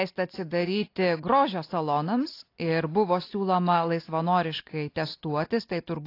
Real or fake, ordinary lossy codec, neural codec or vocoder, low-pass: real; AAC, 32 kbps; none; 5.4 kHz